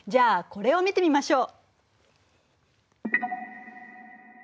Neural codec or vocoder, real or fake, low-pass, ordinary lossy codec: none; real; none; none